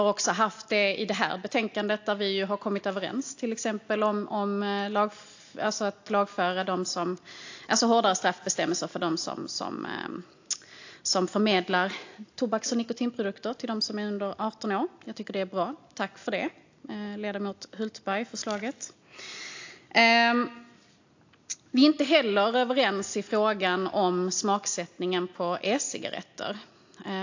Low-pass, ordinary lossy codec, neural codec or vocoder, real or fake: 7.2 kHz; AAC, 48 kbps; none; real